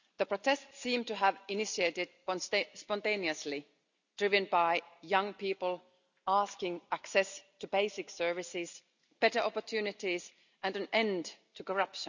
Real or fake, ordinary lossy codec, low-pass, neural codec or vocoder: real; none; 7.2 kHz; none